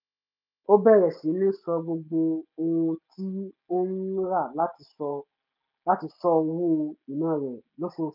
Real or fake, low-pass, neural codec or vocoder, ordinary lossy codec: real; 5.4 kHz; none; none